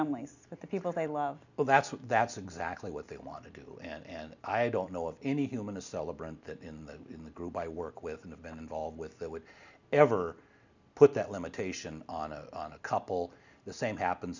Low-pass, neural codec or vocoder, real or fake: 7.2 kHz; none; real